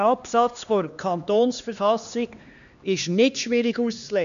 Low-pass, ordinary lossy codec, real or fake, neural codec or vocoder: 7.2 kHz; none; fake; codec, 16 kHz, 2 kbps, X-Codec, HuBERT features, trained on LibriSpeech